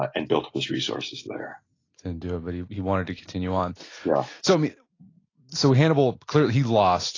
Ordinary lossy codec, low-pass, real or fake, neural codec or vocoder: AAC, 32 kbps; 7.2 kHz; real; none